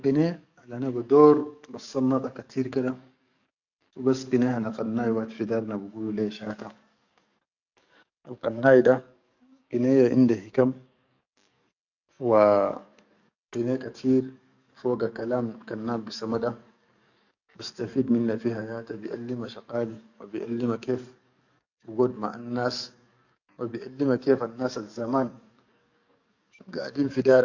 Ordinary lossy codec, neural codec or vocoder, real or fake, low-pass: none; codec, 44.1 kHz, 7.8 kbps, DAC; fake; 7.2 kHz